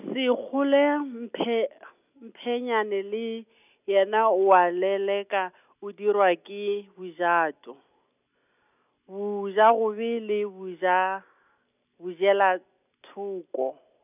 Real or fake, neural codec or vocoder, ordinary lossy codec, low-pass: real; none; none; 3.6 kHz